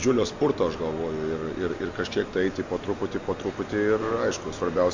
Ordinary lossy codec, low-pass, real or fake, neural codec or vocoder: MP3, 48 kbps; 7.2 kHz; real; none